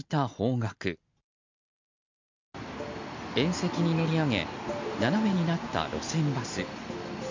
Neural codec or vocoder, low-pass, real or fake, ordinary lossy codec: none; 7.2 kHz; real; MP3, 64 kbps